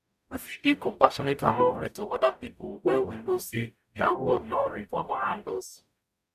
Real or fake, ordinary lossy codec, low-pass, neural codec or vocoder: fake; none; 14.4 kHz; codec, 44.1 kHz, 0.9 kbps, DAC